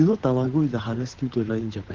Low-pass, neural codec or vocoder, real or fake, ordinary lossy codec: 7.2 kHz; codec, 16 kHz, 8 kbps, FunCodec, trained on Chinese and English, 25 frames a second; fake; Opus, 16 kbps